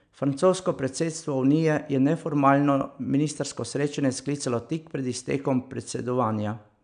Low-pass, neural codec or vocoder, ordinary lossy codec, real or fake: 9.9 kHz; none; none; real